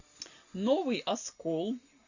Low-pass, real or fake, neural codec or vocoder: 7.2 kHz; real; none